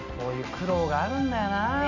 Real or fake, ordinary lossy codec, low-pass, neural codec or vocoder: real; none; 7.2 kHz; none